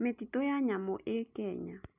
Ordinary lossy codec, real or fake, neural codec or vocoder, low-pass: none; real; none; 3.6 kHz